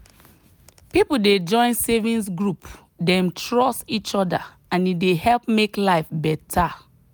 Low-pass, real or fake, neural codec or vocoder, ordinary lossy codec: none; real; none; none